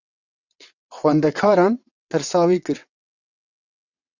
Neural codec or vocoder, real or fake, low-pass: vocoder, 22.05 kHz, 80 mel bands, WaveNeXt; fake; 7.2 kHz